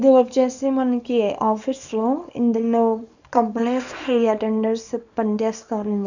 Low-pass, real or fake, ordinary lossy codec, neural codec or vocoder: 7.2 kHz; fake; none; codec, 24 kHz, 0.9 kbps, WavTokenizer, small release